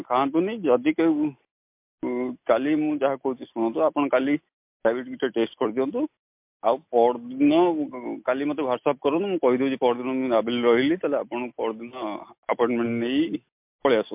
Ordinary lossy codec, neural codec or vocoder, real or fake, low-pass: MP3, 32 kbps; none; real; 3.6 kHz